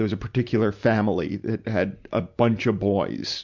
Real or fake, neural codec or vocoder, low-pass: real; none; 7.2 kHz